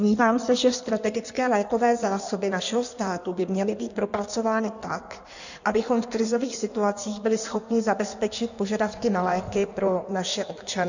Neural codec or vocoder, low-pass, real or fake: codec, 16 kHz in and 24 kHz out, 1.1 kbps, FireRedTTS-2 codec; 7.2 kHz; fake